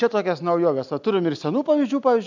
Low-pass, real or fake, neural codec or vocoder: 7.2 kHz; real; none